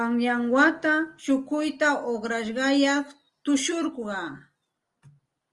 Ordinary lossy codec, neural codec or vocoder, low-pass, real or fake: Opus, 24 kbps; none; 10.8 kHz; real